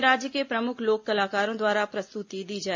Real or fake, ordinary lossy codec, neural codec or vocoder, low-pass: real; MP3, 48 kbps; none; 7.2 kHz